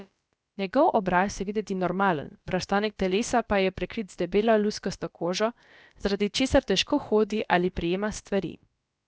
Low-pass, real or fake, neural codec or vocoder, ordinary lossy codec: none; fake; codec, 16 kHz, about 1 kbps, DyCAST, with the encoder's durations; none